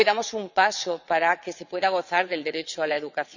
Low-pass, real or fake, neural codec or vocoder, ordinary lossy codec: 7.2 kHz; fake; vocoder, 22.05 kHz, 80 mel bands, WaveNeXt; none